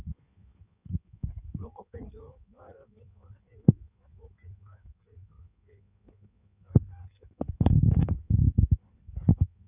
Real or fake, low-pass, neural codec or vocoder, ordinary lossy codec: fake; 3.6 kHz; codec, 16 kHz in and 24 kHz out, 1.1 kbps, FireRedTTS-2 codec; none